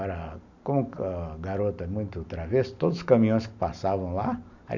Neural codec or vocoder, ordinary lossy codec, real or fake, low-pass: none; none; real; 7.2 kHz